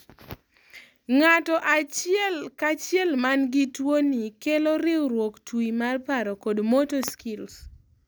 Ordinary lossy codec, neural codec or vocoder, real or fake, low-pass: none; none; real; none